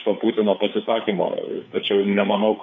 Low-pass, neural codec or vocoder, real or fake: 7.2 kHz; codec, 16 kHz, 4 kbps, FreqCodec, larger model; fake